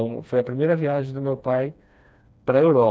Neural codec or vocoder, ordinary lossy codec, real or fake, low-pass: codec, 16 kHz, 2 kbps, FreqCodec, smaller model; none; fake; none